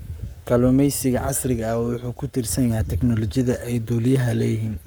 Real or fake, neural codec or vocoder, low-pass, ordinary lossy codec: fake; codec, 44.1 kHz, 7.8 kbps, Pupu-Codec; none; none